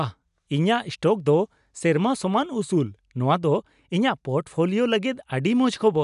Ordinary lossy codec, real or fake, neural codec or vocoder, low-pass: none; real; none; 10.8 kHz